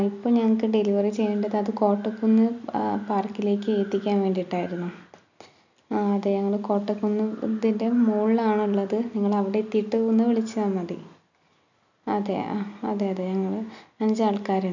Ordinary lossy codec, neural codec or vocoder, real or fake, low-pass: none; none; real; 7.2 kHz